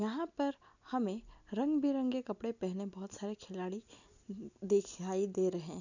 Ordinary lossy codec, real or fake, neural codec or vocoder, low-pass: none; real; none; 7.2 kHz